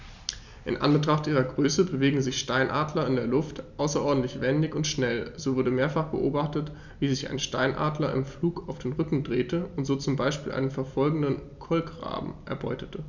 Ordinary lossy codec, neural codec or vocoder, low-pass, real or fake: none; none; 7.2 kHz; real